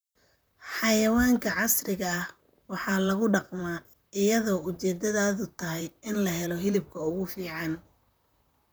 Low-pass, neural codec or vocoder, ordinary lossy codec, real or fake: none; vocoder, 44.1 kHz, 128 mel bands, Pupu-Vocoder; none; fake